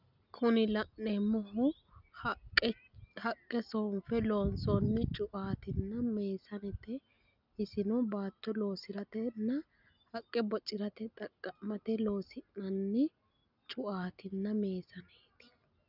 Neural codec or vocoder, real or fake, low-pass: none; real; 5.4 kHz